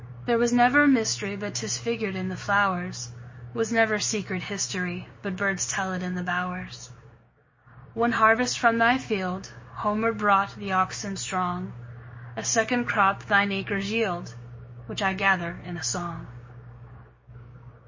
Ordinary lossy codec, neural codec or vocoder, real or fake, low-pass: MP3, 32 kbps; codec, 16 kHz, 6 kbps, DAC; fake; 7.2 kHz